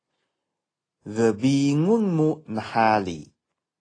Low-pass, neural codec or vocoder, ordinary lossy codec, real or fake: 9.9 kHz; none; AAC, 32 kbps; real